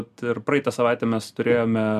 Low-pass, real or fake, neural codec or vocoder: 14.4 kHz; real; none